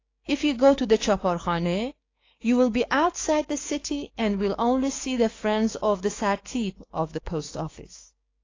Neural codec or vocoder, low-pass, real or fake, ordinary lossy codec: codec, 16 kHz, 6 kbps, DAC; 7.2 kHz; fake; AAC, 32 kbps